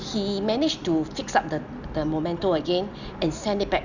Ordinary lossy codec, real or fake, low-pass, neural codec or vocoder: none; real; 7.2 kHz; none